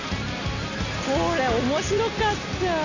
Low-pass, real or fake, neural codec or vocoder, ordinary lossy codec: 7.2 kHz; real; none; none